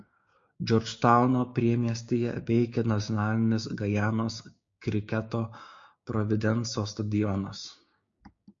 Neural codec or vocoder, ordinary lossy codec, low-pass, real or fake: codec, 16 kHz, 6 kbps, DAC; MP3, 48 kbps; 7.2 kHz; fake